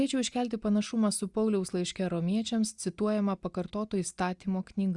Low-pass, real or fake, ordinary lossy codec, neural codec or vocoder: 10.8 kHz; real; Opus, 64 kbps; none